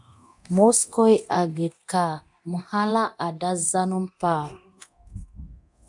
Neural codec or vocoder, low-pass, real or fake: codec, 24 kHz, 0.9 kbps, DualCodec; 10.8 kHz; fake